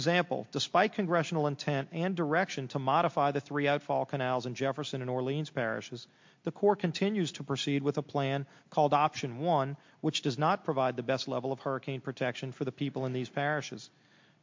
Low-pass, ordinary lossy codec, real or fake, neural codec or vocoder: 7.2 kHz; MP3, 48 kbps; real; none